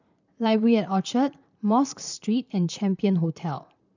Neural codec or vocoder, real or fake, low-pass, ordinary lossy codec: vocoder, 44.1 kHz, 128 mel bands, Pupu-Vocoder; fake; 7.2 kHz; none